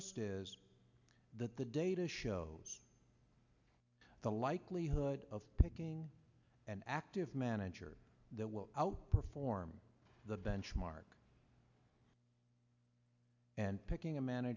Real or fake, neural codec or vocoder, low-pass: real; none; 7.2 kHz